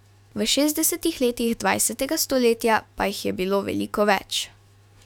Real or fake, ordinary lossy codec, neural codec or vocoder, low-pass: fake; none; autoencoder, 48 kHz, 128 numbers a frame, DAC-VAE, trained on Japanese speech; 19.8 kHz